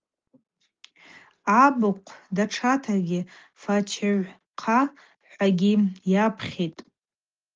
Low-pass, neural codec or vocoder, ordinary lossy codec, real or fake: 7.2 kHz; none; Opus, 32 kbps; real